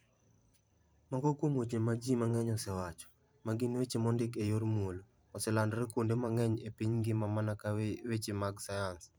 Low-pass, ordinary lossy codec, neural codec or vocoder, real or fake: none; none; vocoder, 44.1 kHz, 128 mel bands every 512 samples, BigVGAN v2; fake